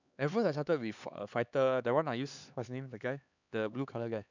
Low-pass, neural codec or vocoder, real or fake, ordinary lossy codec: 7.2 kHz; codec, 16 kHz, 4 kbps, X-Codec, HuBERT features, trained on LibriSpeech; fake; none